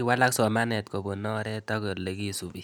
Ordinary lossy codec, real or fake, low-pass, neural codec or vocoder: none; real; none; none